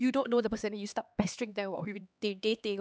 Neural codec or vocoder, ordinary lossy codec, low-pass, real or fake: codec, 16 kHz, 2 kbps, X-Codec, HuBERT features, trained on LibriSpeech; none; none; fake